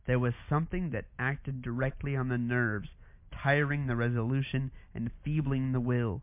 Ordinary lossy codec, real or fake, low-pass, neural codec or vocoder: MP3, 32 kbps; real; 3.6 kHz; none